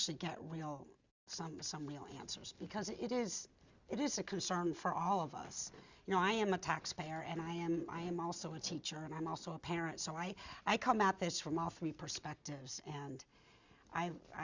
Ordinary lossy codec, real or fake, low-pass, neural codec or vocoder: Opus, 64 kbps; real; 7.2 kHz; none